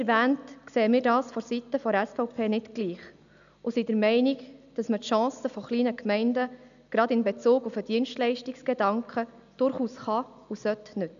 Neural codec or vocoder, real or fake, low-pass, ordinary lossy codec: none; real; 7.2 kHz; none